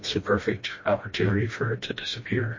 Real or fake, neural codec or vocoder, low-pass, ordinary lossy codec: fake; codec, 16 kHz, 1 kbps, FreqCodec, smaller model; 7.2 kHz; MP3, 32 kbps